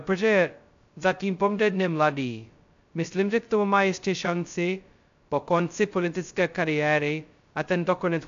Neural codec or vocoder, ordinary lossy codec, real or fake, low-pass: codec, 16 kHz, 0.2 kbps, FocalCodec; AAC, 64 kbps; fake; 7.2 kHz